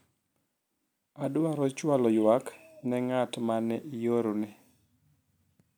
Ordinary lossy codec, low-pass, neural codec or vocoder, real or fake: none; none; none; real